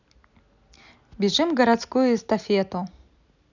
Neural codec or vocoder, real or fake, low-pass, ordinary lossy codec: none; real; 7.2 kHz; none